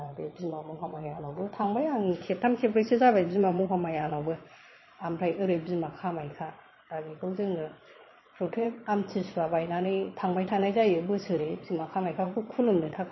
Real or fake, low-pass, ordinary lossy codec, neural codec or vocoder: fake; 7.2 kHz; MP3, 24 kbps; vocoder, 22.05 kHz, 80 mel bands, Vocos